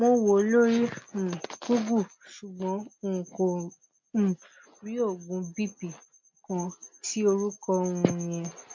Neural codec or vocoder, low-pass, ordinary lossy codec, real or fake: none; 7.2 kHz; MP3, 48 kbps; real